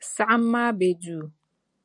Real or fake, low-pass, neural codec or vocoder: real; 10.8 kHz; none